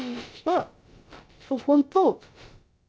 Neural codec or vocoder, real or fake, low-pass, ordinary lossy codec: codec, 16 kHz, about 1 kbps, DyCAST, with the encoder's durations; fake; none; none